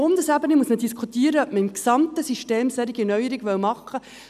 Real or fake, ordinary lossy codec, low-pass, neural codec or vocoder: real; none; 14.4 kHz; none